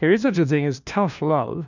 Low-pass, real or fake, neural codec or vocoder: 7.2 kHz; fake; codec, 16 kHz, 1 kbps, FunCodec, trained on LibriTTS, 50 frames a second